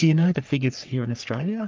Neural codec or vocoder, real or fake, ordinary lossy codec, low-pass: codec, 44.1 kHz, 3.4 kbps, Pupu-Codec; fake; Opus, 24 kbps; 7.2 kHz